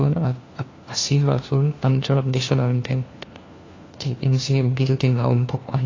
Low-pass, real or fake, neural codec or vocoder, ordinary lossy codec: 7.2 kHz; fake; codec, 16 kHz, 1 kbps, FunCodec, trained on LibriTTS, 50 frames a second; AAC, 32 kbps